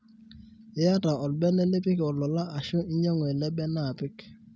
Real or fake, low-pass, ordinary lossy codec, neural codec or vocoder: real; none; none; none